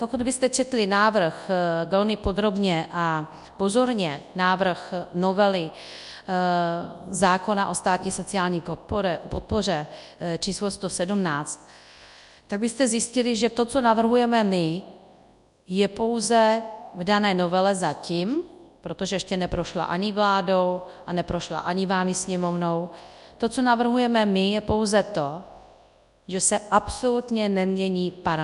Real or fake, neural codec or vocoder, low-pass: fake; codec, 24 kHz, 0.9 kbps, WavTokenizer, large speech release; 10.8 kHz